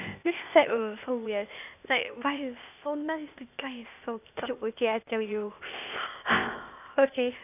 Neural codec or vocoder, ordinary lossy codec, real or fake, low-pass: codec, 16 kHz, 0.8 kbps, ZipCodec; none; fake; 3.6 kHz